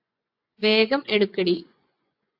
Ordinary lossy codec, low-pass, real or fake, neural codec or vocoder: AAC, 32 kbps; 5.4 kHz; fake; vocoder, 24 kHz, 100 mel bands, Vocos